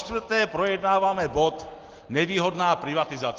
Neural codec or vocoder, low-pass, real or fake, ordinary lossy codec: none; 7.2 kHz; real; Opus, 16 kbps